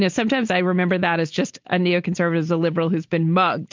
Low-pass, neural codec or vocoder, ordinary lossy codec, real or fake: 7.2 kHz; none; MP3, 64 kbps; real